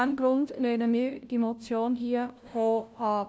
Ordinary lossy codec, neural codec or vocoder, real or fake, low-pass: none; codec, 16 kHz, 0.5 kbps, FunCodec, trained on LibriTTS, 25 frames a second; fake; none